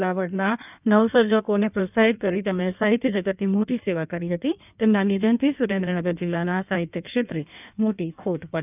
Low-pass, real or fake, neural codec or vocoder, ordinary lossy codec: 3.6 kHz; fake; codec, 16 kHz in and 24 kHz out, 1.1 kbps, FireRedTTS-2 codec; none